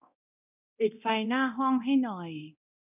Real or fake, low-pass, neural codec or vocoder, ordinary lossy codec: fake; 3.6 kHz; codec, 24 kHz, 0.9 kbps, DualCodec; none